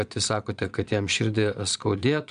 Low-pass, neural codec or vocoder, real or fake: 9.9 kHz; vocoder, 22.05 kHz, 80 mel bands, Vocos; fake